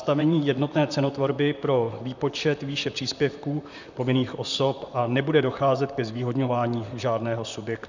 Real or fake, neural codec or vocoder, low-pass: fake; vocoder, 44.1 kHz, 128 mel bands, Pupu-Vocoder; 7.2 kHz